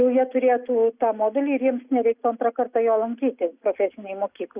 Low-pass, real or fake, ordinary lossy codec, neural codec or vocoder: 3.6 kHz; real; Opus, 64 kbps; none